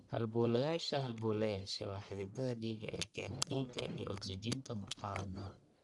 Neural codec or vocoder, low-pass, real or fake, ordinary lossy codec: codec, 44.1 kHz, 1.7 kbps, Pupu-Codec; 10.8 kHz; fake; none